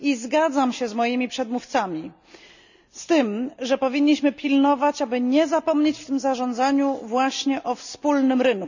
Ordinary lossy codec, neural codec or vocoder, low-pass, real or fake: none; none; 7.2 kHz; real